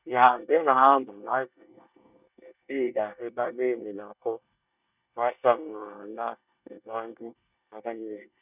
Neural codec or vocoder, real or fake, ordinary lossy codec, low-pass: codec, 24 kHz, 1 kbps, SNAC; fake; none; 3.6 kHz